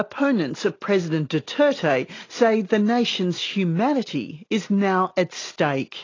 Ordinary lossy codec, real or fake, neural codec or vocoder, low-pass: AAC, 32 kbps; real; none; 7.2 kHz